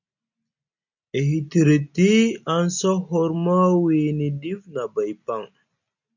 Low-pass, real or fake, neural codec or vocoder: 7.2 kHz; real; none